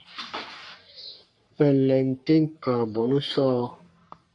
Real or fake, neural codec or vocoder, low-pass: fake; codec, 44.1 kHz, 3.4 kbps, Pupu-Codec; 10.8 kHz